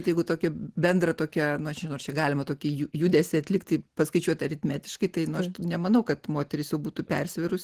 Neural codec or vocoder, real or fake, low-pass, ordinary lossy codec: none; real; 14.4 kHz; Opus, 16 kbps